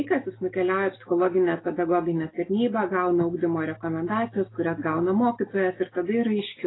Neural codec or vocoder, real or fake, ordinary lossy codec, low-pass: none; real; AAC, 16 kbps; 7.2 kHz